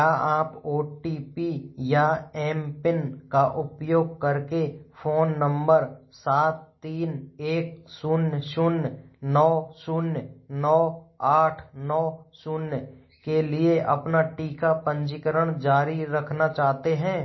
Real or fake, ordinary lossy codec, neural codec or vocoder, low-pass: real; MP3, 24 kbps; none; 7.2 kHz